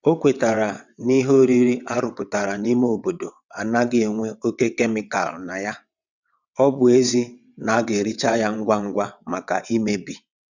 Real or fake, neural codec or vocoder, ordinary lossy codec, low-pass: fake; vocoder, 22.05 kHz, 80 mel bands, WaveNeXt; none; 7.2 kHz